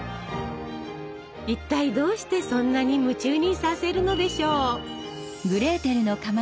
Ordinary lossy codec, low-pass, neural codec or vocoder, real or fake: none; none; none; real